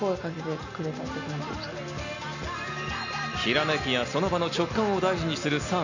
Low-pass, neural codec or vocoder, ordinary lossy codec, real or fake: 7.2 kHz; none; none; real